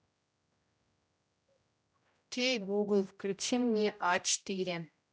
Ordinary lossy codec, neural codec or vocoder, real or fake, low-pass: none; codec, 16 kHz, 0.5 kbps, X-Codec, HuBERT features, trained on general audio; fake; none